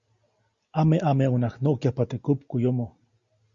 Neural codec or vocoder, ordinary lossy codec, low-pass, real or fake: none; Opus, 64 kbps; 7.2 kHz; real